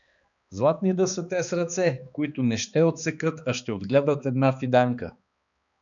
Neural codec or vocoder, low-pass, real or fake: codec, 16 kHz, 2 kbps, X-Codec, HuBERT features, trained on balanced general audio; 7.2 kHz; fake